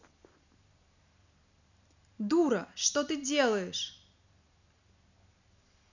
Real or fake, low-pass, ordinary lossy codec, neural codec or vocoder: real; 7.2 kHz; none; none